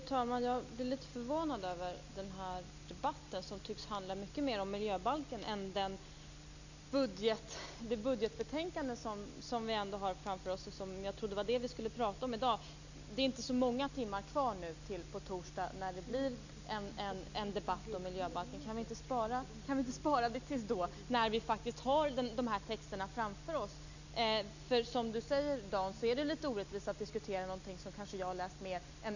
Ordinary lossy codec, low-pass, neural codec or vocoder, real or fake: none; 7.2 kHz; none; real